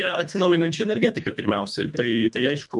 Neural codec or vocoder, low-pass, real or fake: codec, 24 kHz, 1.5 kbps, HILCodec; 10.8 kHz; fake